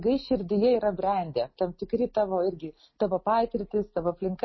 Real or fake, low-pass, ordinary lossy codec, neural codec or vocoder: real; 7.2 kHz; MP3, 24 kbps; none